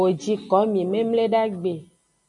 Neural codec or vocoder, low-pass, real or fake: none; 10.8 kHz; real